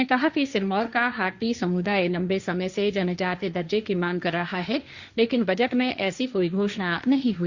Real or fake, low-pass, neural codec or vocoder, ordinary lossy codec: fake; 7.2 kHz; codec, 16 kHz, 1.1 kbps, Voila-Tokenizer; Opus, 64 kbps